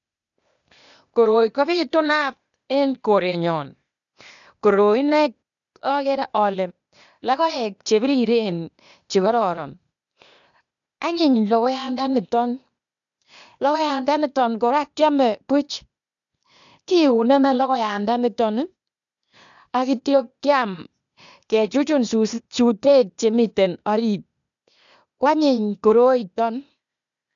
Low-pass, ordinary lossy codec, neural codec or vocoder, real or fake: 7.2 kHz; none; codec, 16 kHz, 0.8 kbps, ZipCodec; fake